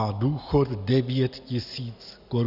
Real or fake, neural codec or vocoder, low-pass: real; none; 5.4 kHz